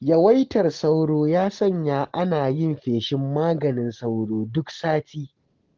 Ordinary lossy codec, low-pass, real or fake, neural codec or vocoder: Opus, 16 kbps; 7.2 kHz; real; none